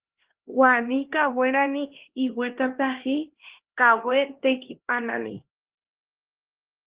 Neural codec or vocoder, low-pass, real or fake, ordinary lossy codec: codec, 16 kHz, 1 kbps, X-Codec, HuBERT features, trained on LibriSpeech; 3.6 kHz; fake; Opus, 16 kbps